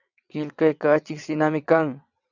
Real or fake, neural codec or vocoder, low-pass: fake; vocoder, 22.05 kHz, 80 mel bands, WaveNeXt; 7.2 kHz